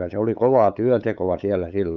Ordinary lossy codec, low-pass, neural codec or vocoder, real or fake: none; 7.2 kHz; codec, 16 kHz, 8 kbps, FunCodec, trained on LibriTTS, 25 frames a second; fake